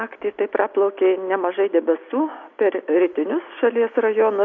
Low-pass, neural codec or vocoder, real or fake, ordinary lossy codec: 7.2 kHz; none; real; AAC, 48 kbps